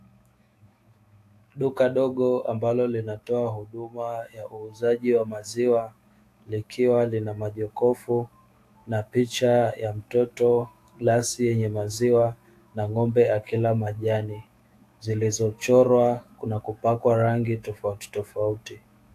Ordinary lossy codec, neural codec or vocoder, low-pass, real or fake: AAC, 64 kbps; autoencoder, 48 kHz, 128 numbers a frame, DAC-VAE, trained on Japanese speech; 14.4 kHz; fake